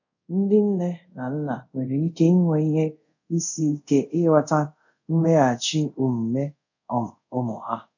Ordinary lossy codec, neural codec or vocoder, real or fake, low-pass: none; codec, 24 kHz, 0.5 kbps, DualCodec; fake; 7.2 kHz